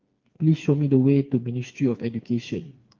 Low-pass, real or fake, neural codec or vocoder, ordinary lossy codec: 7.2 kHz; fake; codec, 16 kHz, 4 kbps, FreqCodec, smaller model; Opus, 32 kbps